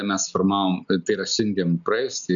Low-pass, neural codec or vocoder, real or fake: 7.2 kHz; none; real